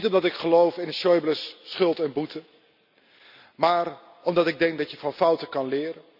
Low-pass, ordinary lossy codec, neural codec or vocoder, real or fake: 5.4 kHz; none; none; real